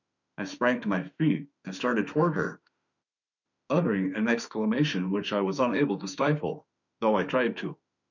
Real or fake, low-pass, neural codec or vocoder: fake; 7.2 kHz; autoencoder, 48 kHz, 32 numbers a frame, DAC-VAE, trained on Japanese speech